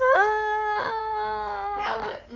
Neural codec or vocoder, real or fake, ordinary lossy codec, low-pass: codec, 16 kHz in and 24 kHz out, 2.2 kbps, FireRedTTS-2 codec; fake; none; 7.2 kHz